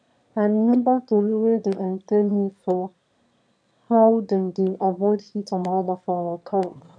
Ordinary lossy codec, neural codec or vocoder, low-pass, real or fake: none; autoencoder, 22.05 kHz, a latent of 192 numbers a frame, VITS, trained on one speaker; 9.9 kHz; fake